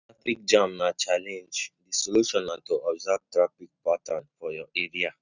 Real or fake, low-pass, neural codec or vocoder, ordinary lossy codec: real; 7.2 kHz; none; none